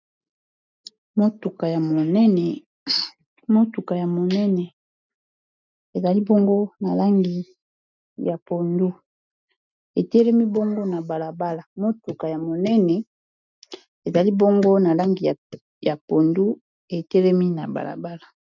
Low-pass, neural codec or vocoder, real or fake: 7.2 kHz; none; real